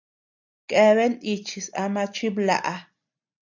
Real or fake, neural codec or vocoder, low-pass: real; none; 7.2 kHz